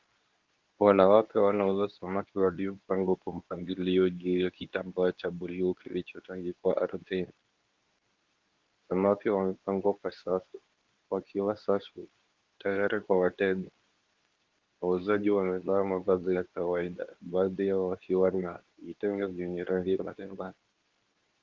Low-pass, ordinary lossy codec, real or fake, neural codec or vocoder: 7.2 kHz; Opus, 32 kbps; fake; codec, 24 kHz, 0.9 kbps, WavTokenizer, medium speech release version 2